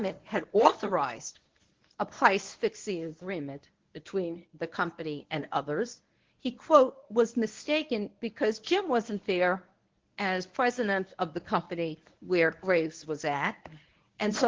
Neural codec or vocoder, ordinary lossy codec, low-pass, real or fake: codec, 24 kHz, 0.9 kbps, WavTokenizer, medium speech release version 1; Opus, 16 kbps; 7.2 kHz; fake